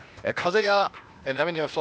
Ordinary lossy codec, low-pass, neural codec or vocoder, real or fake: none; none; codec, 16 kHz, 0.8 kbps, ZipCodec; fake